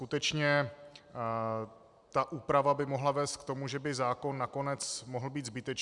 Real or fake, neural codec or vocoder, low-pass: real; none; 10.8 kHz